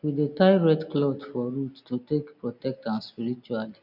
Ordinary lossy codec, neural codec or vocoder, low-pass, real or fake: none; none; 5.4 kHz; real